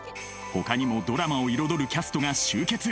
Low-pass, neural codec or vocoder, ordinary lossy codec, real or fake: none; none; none; real